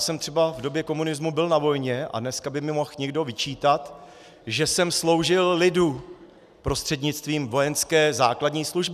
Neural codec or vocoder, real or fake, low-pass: none; real; 14.4 kHz